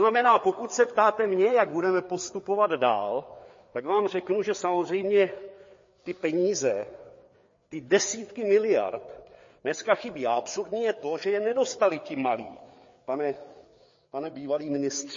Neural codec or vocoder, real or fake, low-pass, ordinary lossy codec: codec, 16 kHz, 4 kbps, FreqCodec, larger model; fake; 7.2 kHz; MP3, 32 kbps